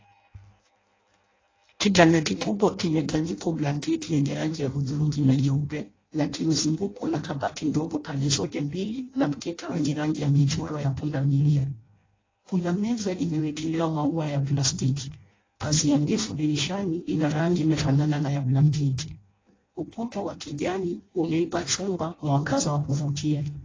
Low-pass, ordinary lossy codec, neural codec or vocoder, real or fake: 7.2 kHz; AAC, 32 kbps; codec, 16 kHz in and 24 kHz out, 0.6 kbps, FireRedTTS-2 codec; fake